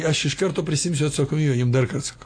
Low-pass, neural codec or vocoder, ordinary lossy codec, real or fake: 9.9 kHz; none; AAC, 48 kbps; real